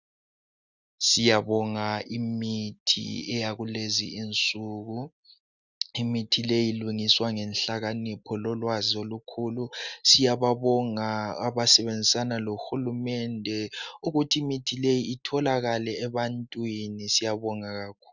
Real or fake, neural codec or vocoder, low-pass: real; none; 7.2 kHz